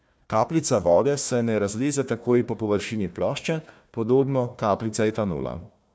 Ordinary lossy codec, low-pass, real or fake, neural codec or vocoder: none; none; fake; codec, 16 kHz, 1 kbps, FunCodec, trained on Chinese and English, 50 frames a second